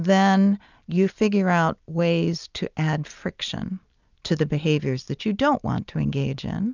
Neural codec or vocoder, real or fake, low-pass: none; real; 7.2 kHz